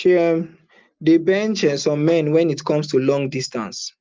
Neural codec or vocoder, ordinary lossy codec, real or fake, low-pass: none; Opus, 24 kbps; real; 7.2 kHz